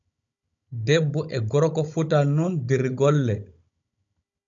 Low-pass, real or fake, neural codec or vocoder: 7.2 kHz; fake; codec, 16 kHz, 16 kbps, FunCodec, trained on Chinese and English, 50 frames a second